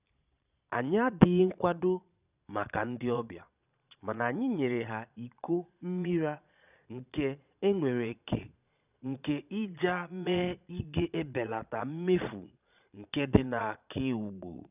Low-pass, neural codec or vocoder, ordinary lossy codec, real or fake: 3.6 kHz; vocoder, 22.05 kHz, 80 mel bands, Vocos; none; fake